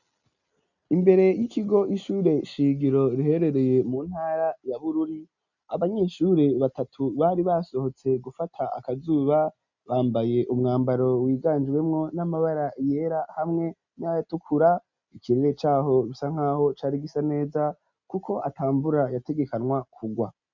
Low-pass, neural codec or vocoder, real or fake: 7.2 kHz; none; real